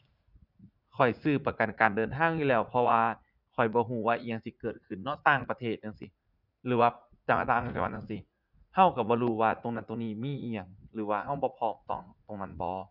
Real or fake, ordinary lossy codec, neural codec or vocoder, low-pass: fake; none; vocoder, 44.1 kHz, 80 mel bands, Vocos; 5.4 kHz